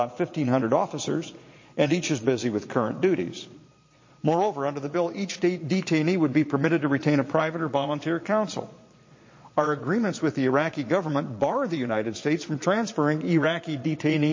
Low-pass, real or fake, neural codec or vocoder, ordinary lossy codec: 7.2 kHz; fake; vocoder, 22.05 kHz, 80 mel bands, Vocos; MP3, 32 kbps